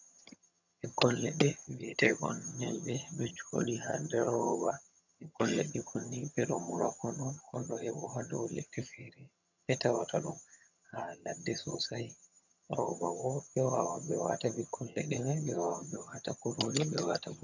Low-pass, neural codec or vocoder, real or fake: 7.2 kHz; vocoder, 22.05 kHz, 80 mel bands, HiFi-GAN; fake